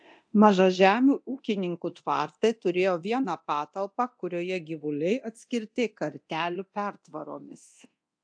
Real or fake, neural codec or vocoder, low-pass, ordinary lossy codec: fake; codec, 24 kHz, 0.9 kbps, DualCodec; 9.9 kHz; AAC, 64 kbps